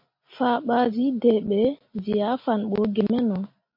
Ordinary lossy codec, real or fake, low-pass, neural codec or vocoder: MP3, 32 kbps; real; 5.4 kHz; none